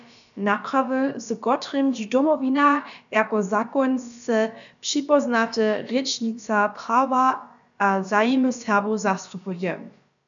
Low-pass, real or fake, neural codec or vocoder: 7.2 kHz; fake; codec, 16 kHz, about 1 kbps, DyCAST, with the encoder's durations